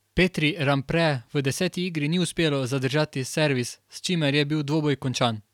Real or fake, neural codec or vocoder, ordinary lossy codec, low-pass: real; none; none; 19.8 kHz